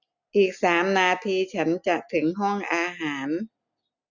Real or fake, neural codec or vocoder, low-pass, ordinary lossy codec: real; none; 7.2 kHz; none